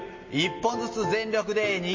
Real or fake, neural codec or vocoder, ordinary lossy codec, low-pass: real; none; MP3, 32 kbps; 7.2 kHz